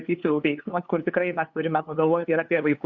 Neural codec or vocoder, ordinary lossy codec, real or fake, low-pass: codec, 16 kHz, 2 kbps, FunCodec, trained on LibriTTS, 25 frames a second; Opus, 64 kbps; fake; 7.2 kHz